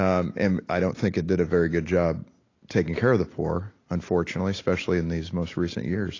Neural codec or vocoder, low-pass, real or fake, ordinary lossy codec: none; 7.2 kHz; real; AAC, 32 kbps